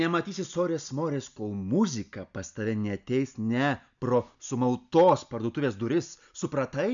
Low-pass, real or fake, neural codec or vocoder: 7.2 kHz; real; none